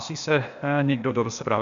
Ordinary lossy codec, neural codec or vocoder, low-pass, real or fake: AAC, 96 kbps; codec, 16 kHz, 0.8 kbps, ZipCodec; 7.2 kHz; fake